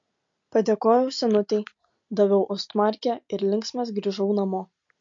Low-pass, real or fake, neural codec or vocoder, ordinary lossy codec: 7.2 kHz; real; none; MP3, 48 kbps